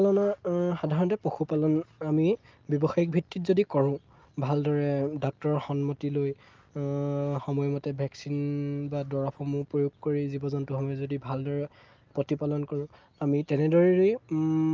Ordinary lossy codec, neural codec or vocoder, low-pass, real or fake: Opus, 32 kbps; none; 7.2 kHz; real